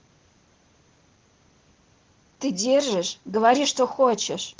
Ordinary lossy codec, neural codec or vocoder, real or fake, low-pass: Opus, 16 kbps; none; real; 7.2 kHz